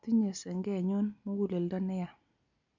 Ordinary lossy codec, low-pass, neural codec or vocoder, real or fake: none; 7.2 kHz; none; real